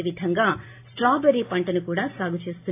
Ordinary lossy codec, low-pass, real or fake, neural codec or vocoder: AAC, 24 kbps; 3.6 kHz; real; none